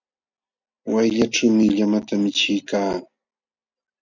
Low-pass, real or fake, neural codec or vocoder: 7.2 kHz; real; none